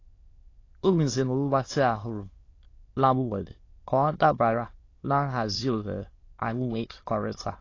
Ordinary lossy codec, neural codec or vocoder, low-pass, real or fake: AAC, 32 kbps; autoencoder, 22.05 kHz, a latent of 192 numbers a frame, VITS, trained on many speakers; 7.2 kHz; fake